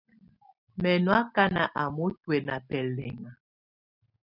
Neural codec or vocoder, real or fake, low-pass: none; real; 5.4 kHz